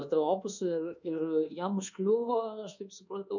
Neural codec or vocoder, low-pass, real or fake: codec, 24 kHz, 1.2 kbps, DualCodec; 7.2 kHz; fake